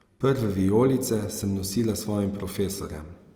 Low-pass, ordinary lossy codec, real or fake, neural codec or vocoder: 19.8 kHz; Opus, 24 kbps; real; none